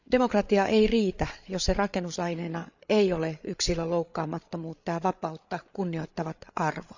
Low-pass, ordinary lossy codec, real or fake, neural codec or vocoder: 7.2 kHz; none; fake; codec, 16 kHz, 8 kbps, FreqCodec, larger model